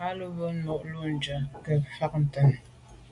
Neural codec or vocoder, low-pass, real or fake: none; 10.8 kHz; real